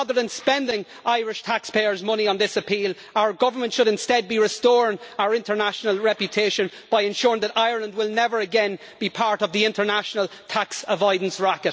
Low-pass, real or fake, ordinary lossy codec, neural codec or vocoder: none; real; none; none